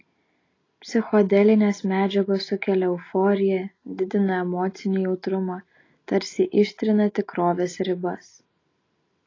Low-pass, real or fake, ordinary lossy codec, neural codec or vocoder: 7.2 kHz; real; AAC, 32 kbps; none